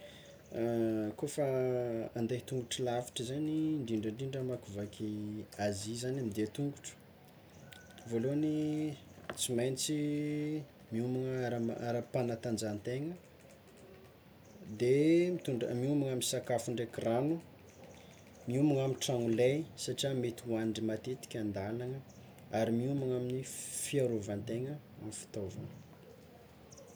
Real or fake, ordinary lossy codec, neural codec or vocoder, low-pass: real; none; none; none